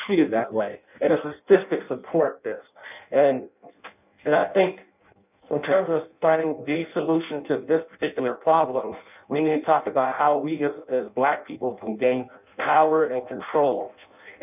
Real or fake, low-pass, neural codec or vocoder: fake; 3.6 kHz; codec, 16 kHz in and 24 kHz out, 0.6 kbps, FireRedTTS-2 codec